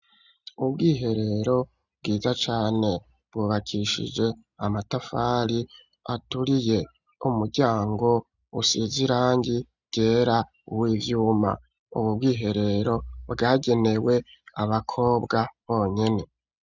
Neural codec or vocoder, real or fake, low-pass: none; real; 7.2 kHz